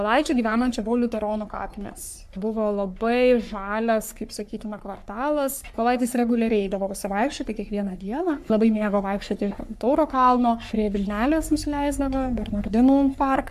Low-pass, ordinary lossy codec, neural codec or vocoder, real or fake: 14.4 kHz; AAC, 96 kbps; codec, 44.1 kHz, 3.4 kbps, Pupu-Codec; fake